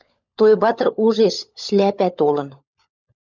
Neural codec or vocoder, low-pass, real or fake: codec, 16 kHz, 16 kbps, FunCodec, trained on LibriTTS, 50 frames a second; 7.2 kHz; fake